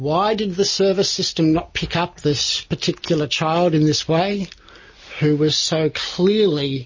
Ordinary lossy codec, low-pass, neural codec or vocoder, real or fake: MP3, 32 kbps; 7.2 kHz; none; real